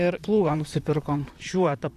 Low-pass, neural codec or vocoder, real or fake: 14.4 kHz; vocoder, 44.1 kHz, 128 mel bands, Pupu-Vocoder; fake